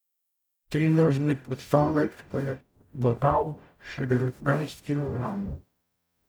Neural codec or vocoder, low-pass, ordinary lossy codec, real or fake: codec, 44.1 kHz, 0.9 kbps, DAC; none; none; fake